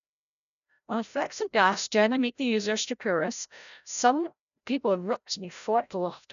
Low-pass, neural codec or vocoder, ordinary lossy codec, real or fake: 7.2 kHz; codec, 16 kHz, 0.5 kbps, FreqCodec, larger model; none; fake